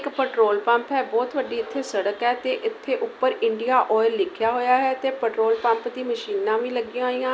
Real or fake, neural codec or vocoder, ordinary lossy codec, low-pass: real; none; none; none